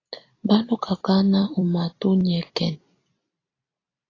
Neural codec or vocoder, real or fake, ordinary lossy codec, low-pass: vocoder, 44.1 kHz, 128 mel bands every 256 samples, BigVGAN v2; fake; AAC, 32 kbps; 7.2 kHz